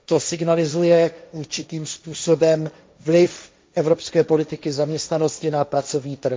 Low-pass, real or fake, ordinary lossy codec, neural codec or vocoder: none; fake; none; codec, 16 kHz, 1.1 kbps, Voila-Tokenizer